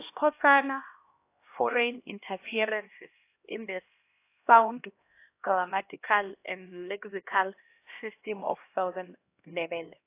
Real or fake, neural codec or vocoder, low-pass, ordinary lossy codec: fake; codec, 16 kHz, 1 kbps, X-Codec, HuBERT features, trained on LibriSpeech; 3.6 kHz; AAC, 24 kbps